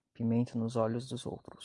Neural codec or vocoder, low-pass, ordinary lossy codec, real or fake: none; 10.8 kHz; Opus, 32 kbps; real